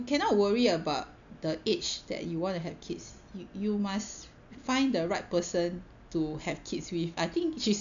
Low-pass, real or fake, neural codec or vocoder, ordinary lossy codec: 7.2 kHz; real; none; none